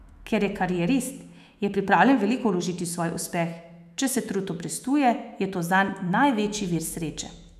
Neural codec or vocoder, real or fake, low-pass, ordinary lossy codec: autoencoder, 48 kHz, 128 numbers a frame, DAC-VAE, trained on Japanese speech; fake; 14.4 kHz; none